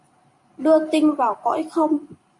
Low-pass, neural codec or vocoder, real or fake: 10.8 kHz; vocoder, 44.1 kHz, 128 mel bands every 512 samples, BigVGAN v2; fake